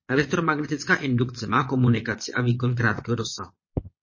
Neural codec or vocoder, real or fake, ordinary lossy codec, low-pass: vocoder, 22.05 kHz, 80 mel bands, Vocos; fake; MP3, 32 kbps; 7.2 kHz